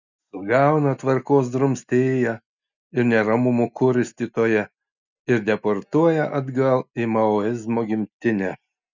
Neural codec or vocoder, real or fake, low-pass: none; real; 7.2 kHz